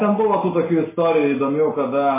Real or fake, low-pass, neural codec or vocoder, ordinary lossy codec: real; 3.6 kHz; none; MP3, 16 kbps